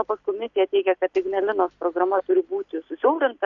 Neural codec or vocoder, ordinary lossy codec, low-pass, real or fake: none; MP3, 96 kbps; 7.2 kHz; real